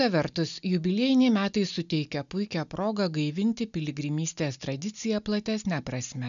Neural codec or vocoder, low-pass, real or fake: none; 7.2 kHz; real